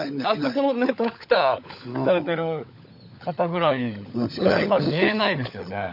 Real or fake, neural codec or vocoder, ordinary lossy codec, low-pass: fake; codec, 16 kHz, 16 kbps, FunCodec, trained on LibriTTS, 50 frames a second; none; 5.4 kHz